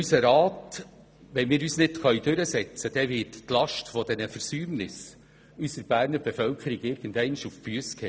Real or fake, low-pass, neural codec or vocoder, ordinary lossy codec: real; none; none; none